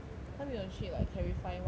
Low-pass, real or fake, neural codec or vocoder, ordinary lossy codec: none; real; none; none